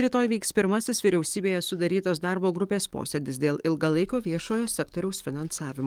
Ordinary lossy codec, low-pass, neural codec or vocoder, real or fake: Opus, 32 kbps; 19.8 kHz; codec, 44.1 kHz, 7.8 kbps, DAC; fake